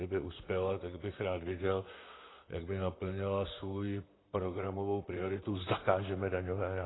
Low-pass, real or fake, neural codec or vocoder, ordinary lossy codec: 7.2 kHz; fake; vocoder, 44.1 kHz, 128 mel bands, Pupu-Vocoder; AAC, 16 kbps